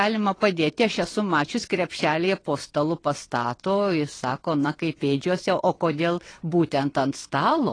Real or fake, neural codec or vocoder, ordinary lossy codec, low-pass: fake; vocoder, 22.05 kHz, 80 mel bands, WaveNeXt; AAC, 32 kbps; 9.9 kHz